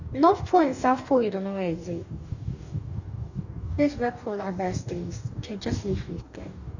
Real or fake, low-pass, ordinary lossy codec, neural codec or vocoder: fake; 7.2 kHz; none; codec, 44.1 kHz, 2.6 kbps, DAC